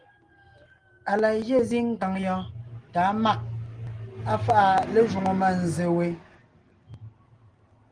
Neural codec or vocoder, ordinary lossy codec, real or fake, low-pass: none; Opus, 24 kbps; real; 9.9 kHz